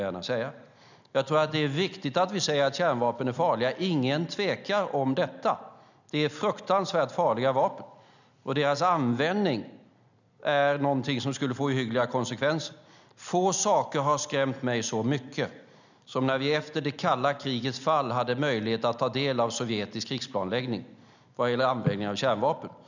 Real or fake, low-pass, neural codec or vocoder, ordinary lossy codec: real; 7.2 kHz; none; none